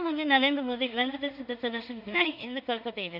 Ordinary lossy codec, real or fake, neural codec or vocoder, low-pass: none; fake; codec, 16 kHz in and 24 kHz out, 0.4 kbps, LongCat-Audio-Codec, two codebook decoder; 5.4 kHz